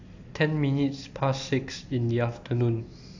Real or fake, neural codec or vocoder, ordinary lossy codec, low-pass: real; none; AAC, 48 kbps; 7.2 kHz